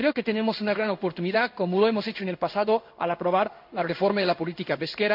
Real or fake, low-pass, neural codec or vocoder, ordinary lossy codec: fake; 5.4 kHz; codec, 16 kHz in and 24 kHz out, 1 kbps, XY-Tokenizer; AAC, 48 kbps